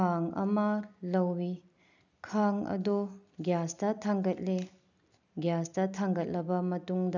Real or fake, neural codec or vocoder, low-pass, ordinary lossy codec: real; none; 7.2 kHz; none